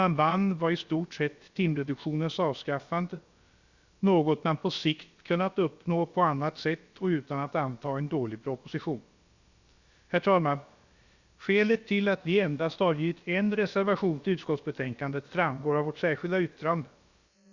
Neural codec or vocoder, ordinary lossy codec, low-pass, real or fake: codec, 16 kHz, about 1 kbps, DyCAST, with the encoder's durations; Opus, 64 kbps; 7.2 kHz; fake